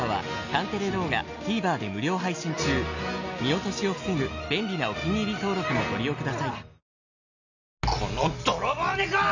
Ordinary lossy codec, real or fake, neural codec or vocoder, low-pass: none; real; none; 7.2 kHz